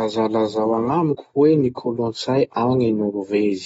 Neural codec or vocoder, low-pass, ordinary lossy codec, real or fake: none; 9.9 kHz; AAC, 24 kbps; real